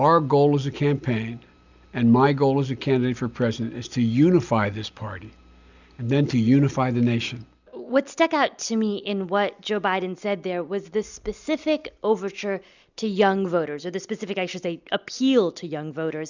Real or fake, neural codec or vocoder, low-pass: real; none; 7.2 kHz